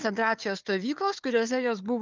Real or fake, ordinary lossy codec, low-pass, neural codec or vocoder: fake; Opus, 24 kbps; 7.2 kHz; codec, 16 kHz, 4 kbps, FunCodec, trained on Chinese and English, 50 frames a second